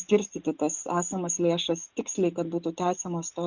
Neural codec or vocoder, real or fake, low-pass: none; real; 7.2 kHz